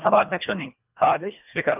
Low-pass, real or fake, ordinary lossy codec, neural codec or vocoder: 3.6 kHz; fake; none; codec, 24 kHz, 1.5 kbps, HILCodec